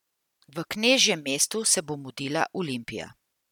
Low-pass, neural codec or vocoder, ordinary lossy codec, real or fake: 19.8 kHz; none; none; real